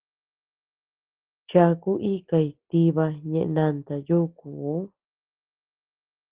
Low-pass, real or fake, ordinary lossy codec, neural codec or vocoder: 3.6 kHz; real; Opus, 16 kbps; none